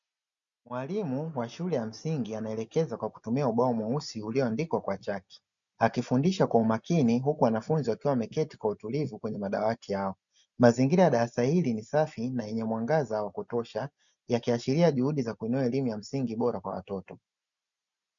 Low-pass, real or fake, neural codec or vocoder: 7.2 kHz; real; none